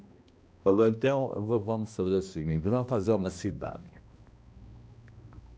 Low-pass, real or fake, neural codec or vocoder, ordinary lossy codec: none; fake; codec, 16 kHz, 1 kbps, X-Codec, HuBERT features, trained on balanced general audio; none